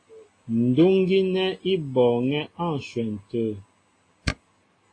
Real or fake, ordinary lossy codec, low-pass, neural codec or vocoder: real; AAC, 32 kbps; 9.9 kHz; none